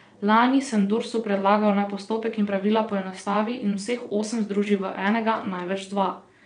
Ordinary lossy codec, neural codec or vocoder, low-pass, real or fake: none; vocoder, 22.05 kHz, 80 mel bands, WaveNeXt; 9.9 kHz; fake